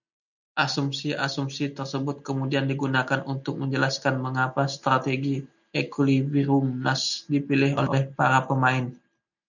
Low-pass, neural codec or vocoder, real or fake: 7.2 kHz; none; real